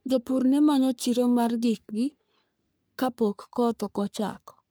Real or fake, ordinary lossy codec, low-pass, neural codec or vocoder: fake; none; none; codec, 44.1 kHz, 3.4 kbps, Pupu-Codec